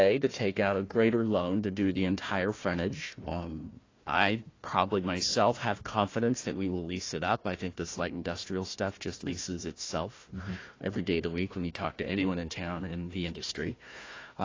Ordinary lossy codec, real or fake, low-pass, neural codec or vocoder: AAC, 32 kbps; fake; 7.2 kHz; codec, 16 kHz, 1 kbps, FunCodec, trained on Chinese and English, 50 frames a second